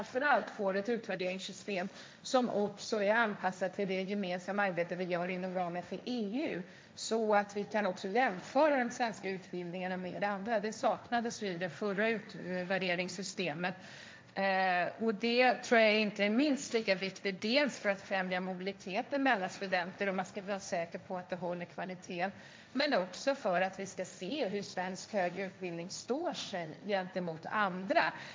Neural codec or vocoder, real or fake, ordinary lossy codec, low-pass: codec, 16 kHz, 1.1 kbps, Voila-Tokenizer; fake; none; none